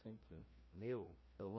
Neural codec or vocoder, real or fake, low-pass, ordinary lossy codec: codec, 16 kHz, 1 kbps, FreqCodec, larger model; fake; 7.2 kHz; MP3, 24 kbps